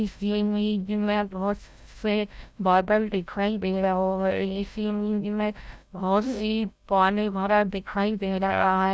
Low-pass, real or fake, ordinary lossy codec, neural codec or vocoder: none; fake; none; codec, 16 kHz, 0.5 kbps, FreqCodec, larger model